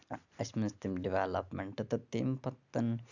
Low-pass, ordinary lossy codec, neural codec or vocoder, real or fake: 7.2 kHz; none; none; real